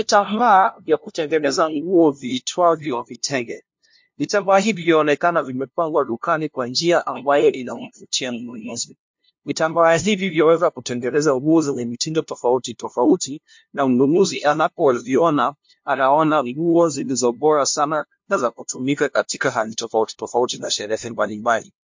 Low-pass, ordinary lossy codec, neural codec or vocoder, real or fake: 7.2 kHz; MP3, 48 kbps; codec, 16 kHz, 0.5 kbps, FunCodec, trained on LibriTTS, 25 frames a second; fake